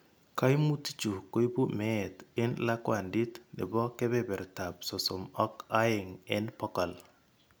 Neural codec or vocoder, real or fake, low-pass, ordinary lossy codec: none; real; none; none